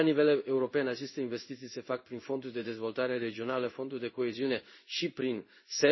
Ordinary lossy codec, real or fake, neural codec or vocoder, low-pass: MP3, 24 kbps; fake; codec, 16 kHz in and 24 kHz out, 1 kbps, XY-Tokenizer; 7.2 kHz